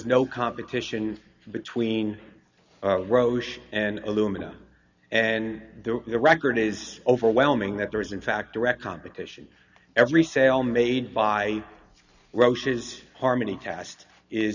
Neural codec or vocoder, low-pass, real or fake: none; 7.2 kHz; real